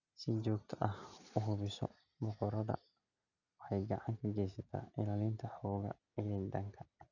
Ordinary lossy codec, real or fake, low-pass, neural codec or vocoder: none; real; 7.2 kHz; none